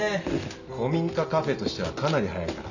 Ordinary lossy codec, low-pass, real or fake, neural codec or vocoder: none; 7.2 kHz; real; none